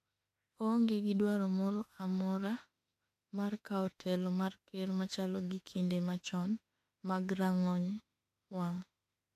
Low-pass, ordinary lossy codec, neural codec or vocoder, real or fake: 14.4 kHz; AAC, 64 kbps; autoencoder, 48 kHz, 32 numbers a frame, DAC-VAE, trained on Japanese speech; fake